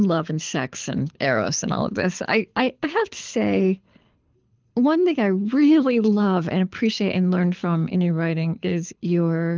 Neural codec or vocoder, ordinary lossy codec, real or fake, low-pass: codec, 24 kHz, 6 kbps, HILCodec; Opus, 24 kbps; fake; 7.2 kHz